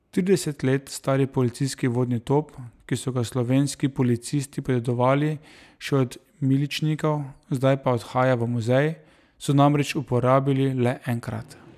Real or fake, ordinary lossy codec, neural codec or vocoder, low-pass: real; none; none; 14.4 kHz